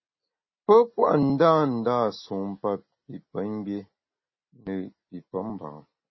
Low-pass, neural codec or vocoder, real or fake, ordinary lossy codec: 7.2 kHz; none; real; MP3, 24 kbps